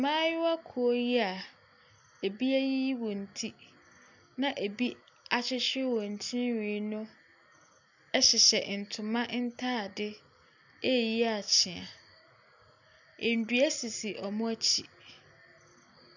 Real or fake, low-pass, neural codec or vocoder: real; 7.2 kHz; none